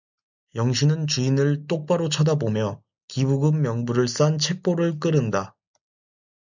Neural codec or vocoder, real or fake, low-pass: none; real; 7.2 kHz